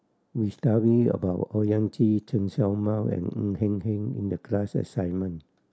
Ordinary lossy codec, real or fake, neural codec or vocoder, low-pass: none; real; none; none